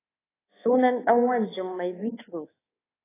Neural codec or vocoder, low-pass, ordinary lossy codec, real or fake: codec, 24 kHz, 1.2 kbps, DualCodec; 3.6 kHz; AAC, 16 kbps; fake